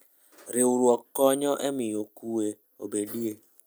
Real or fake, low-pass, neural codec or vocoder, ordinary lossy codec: real; none; none; none